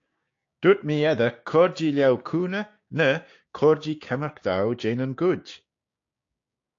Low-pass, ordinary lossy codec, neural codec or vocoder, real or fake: 7.2 kHz; AAC, 48 kbps; codec, 16 kHz, 6 kbps, DAC; fake